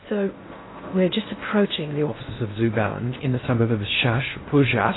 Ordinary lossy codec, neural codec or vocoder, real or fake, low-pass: AAC, 16 kbps; codec, 16 kHz in and 24 kHz out, 0.8 kbps, FocalCodec, streaming, 65536 codes; fake; 7.2 kHz